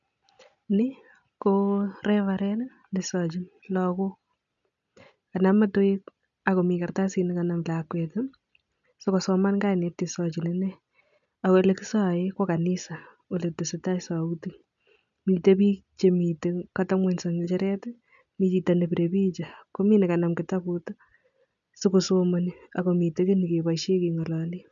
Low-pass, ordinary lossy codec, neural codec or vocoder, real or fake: 7.2 kHz; none; none; real